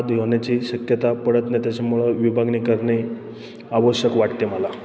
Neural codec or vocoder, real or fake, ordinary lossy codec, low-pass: none; real; none; none